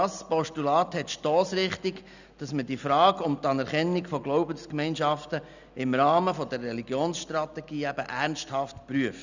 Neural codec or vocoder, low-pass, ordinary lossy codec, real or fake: none; 7.2 kHz; none; real